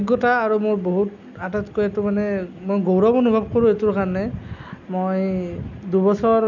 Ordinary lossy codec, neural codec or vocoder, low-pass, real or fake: none; none; 7.2 kHz; real